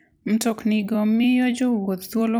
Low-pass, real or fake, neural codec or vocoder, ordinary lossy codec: none; fake; vocoder, 44.1 kHz, 128 mel bands every 256 samples, BigVGAN v2; none